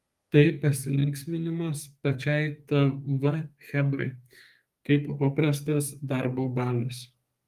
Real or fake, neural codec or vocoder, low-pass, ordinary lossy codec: fake; codec, 32 kHz, 1.9 kbps, SNAC; 14.4 kHz; Opus, 32 kbps